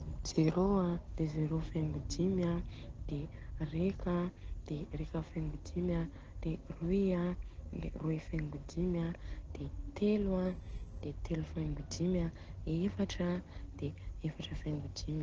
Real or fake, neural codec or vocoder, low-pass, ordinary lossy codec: real; none; 7.2 kHz; Opus, 16 kbps